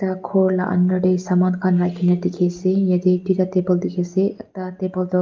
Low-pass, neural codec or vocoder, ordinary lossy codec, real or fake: 7.2 kHz; none; Opus, 24 kbps; real